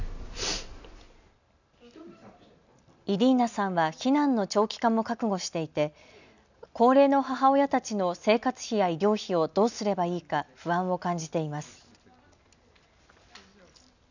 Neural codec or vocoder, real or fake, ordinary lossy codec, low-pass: none; real; none; 7.2 kHz